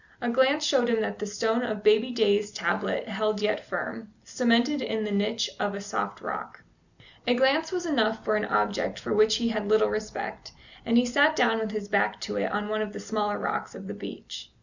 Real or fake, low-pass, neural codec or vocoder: real; 7.2 kHz; none